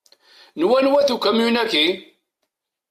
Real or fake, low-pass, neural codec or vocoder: real; 14.4 kHz; none